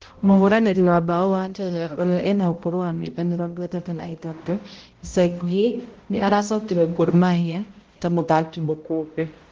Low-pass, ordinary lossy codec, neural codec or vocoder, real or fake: 7.2 kHz; Opus, 16 kbps; codec, 16 kHz, 0.5 kbps, X-Codec, HuBERT features, trained on balanced general audio; fake